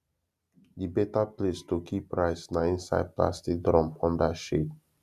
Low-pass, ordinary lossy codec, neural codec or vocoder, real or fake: 14.4 kHz; none; none; real